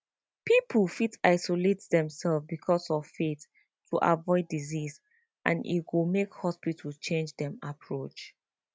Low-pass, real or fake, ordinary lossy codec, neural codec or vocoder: none; real; none; none